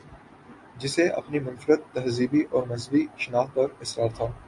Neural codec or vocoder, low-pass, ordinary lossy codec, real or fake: none; 10.8 kHz; MP3, 48 kbps; real